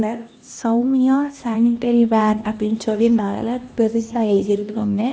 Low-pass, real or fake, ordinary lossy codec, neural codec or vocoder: none; fake; none; codec, 16 kHz, 1 kbps, X-Codec, HuBERT features, trained on LibriSpeech